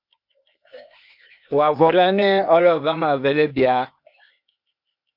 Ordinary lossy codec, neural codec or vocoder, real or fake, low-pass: MP3, 48 kbps; codec, 16 kHz, 0.8 kbps, ZipCodec; fake; 5.4 kHz